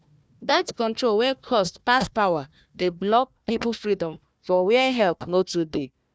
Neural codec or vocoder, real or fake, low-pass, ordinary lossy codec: codec, 16 kHz, 1 kbps, FunCodec, trained on Chinese and English, 50 frames a second; fake; none; none